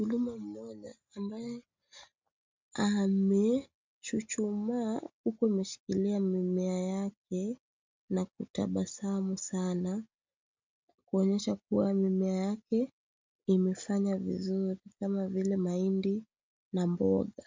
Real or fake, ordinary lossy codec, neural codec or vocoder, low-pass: real; MP3, 64 kbps; none; 7.2 kHz